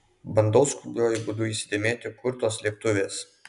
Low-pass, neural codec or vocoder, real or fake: 10.8 kHz; none; real